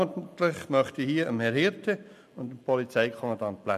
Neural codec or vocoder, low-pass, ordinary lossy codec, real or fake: none; 14.4 kHz; MP3, 96 kbps; real